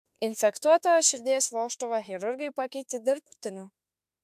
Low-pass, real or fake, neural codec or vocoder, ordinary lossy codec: 14.4 kHz; fake; autoencoder, 48 kHz, 32 numbers a frame, DAC-VAE, trained on Japanese speech; AAC, 96 kbps